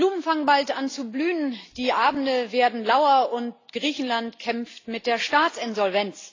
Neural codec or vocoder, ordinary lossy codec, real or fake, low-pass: none; AAC, 32 kbps; real; 7.2 kHz